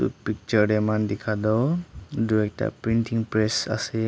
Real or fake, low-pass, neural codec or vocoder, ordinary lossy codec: real; none; none; none